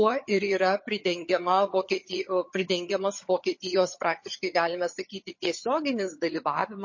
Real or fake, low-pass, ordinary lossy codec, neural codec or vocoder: fake; 7.2 kHz; MP3, 32 kbps; vocoder, 22.05 kHz, 80 mel bands, HiFi-GAN